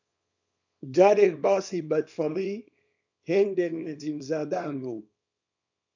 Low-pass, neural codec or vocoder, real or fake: 7.2 kHz; codec, 24 kHz, 0.9 kbps, WavTokenizer, small release; fake